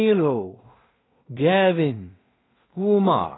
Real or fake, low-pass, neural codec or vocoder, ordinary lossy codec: fake; 7.2 kHz; codec, 16 kHz in and 24 kHz out, 0.4 kbps, LongCat-Audio-Codec, two codebook decoder; AAC, 16 kbps